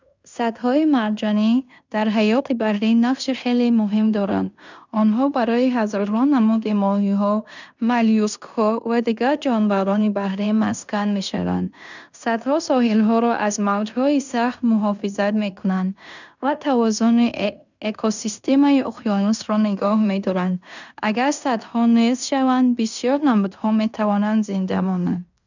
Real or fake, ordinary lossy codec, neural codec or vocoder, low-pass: fake; none; codec, 16 kHz in and 24 kHz out, 0.9 kbps, LongCat-Audio-Codec, fine tuned four codebook decoder; 7.2 kHz